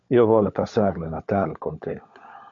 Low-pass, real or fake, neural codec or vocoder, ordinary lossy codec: 7.2 kHz; fake; codec, 16 kHz, 16 kbps, FunCodec, trained on LibriTTS, 50 frames a second; AAC, 64 kbps